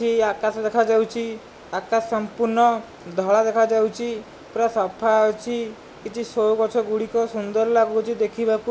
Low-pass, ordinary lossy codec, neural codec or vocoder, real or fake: none; none; none; real